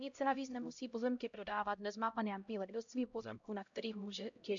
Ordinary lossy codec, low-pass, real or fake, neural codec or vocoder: MP3, 64 kbps; 7.2 kHz; fake; codec, 16 kHz, 0.5 kbps, X-Codec, HuBERT features, trained on LibriSpeech